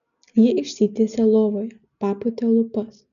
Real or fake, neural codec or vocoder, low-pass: real; none; 7.2 kHz